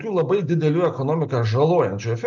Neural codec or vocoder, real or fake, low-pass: none; real; 7.2 kHz